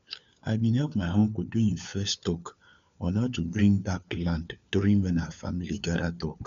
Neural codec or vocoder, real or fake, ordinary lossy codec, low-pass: codec, 16 kHz, 4 kbps, FunCodec, trained on LibriTTS, 50 frames a second; fake; MP3, 64 kbps; 7.2 kHz